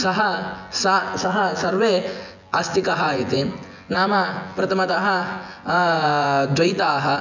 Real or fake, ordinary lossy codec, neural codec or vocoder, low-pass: fake; none; vocoder, 24 kHz, 100 mel bands, Vocos; 7.2 kHz